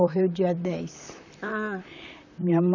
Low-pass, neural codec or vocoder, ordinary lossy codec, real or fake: 7.2 kHz; vocoder, 44.1 kHz, 128 mel bands, Pupu-Vocoder; none; fake